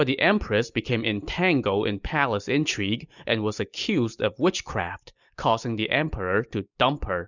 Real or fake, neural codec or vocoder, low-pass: real; none; 7.2 kHz